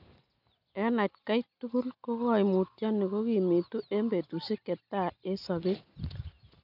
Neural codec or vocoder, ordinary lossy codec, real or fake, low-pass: none; none; real; 5.4 kHz